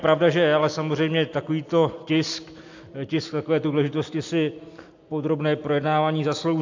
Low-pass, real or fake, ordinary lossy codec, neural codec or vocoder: 7.2 kHz; real; AAC, 48 kbps; none